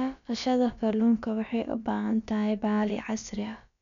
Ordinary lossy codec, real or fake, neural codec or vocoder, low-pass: none; fake; codec, 16 kHz, about 1 kbps, DyCAST, with the encoder's durations; 7.2 kHz